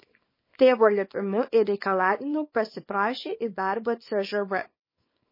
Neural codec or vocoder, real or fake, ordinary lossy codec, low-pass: codec, 24 kHz, 0.9 kbps, WavTokenizer, small release; fake; MP3, 24 kbps; 5.4 kHz